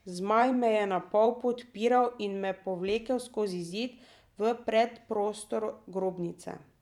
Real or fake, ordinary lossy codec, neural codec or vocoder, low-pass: fake; none; vocoder, 44.1 kHz, 128 mel bands every 512 samples, BigVGAN v2; 19.8 kHz